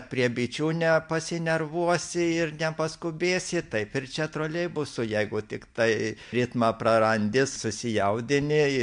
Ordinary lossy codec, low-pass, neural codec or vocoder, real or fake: MP3, 64 kbps; 9.9 kHz; none; real